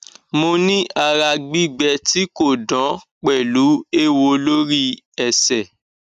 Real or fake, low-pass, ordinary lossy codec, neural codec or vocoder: real; 14.4 kHz; none; none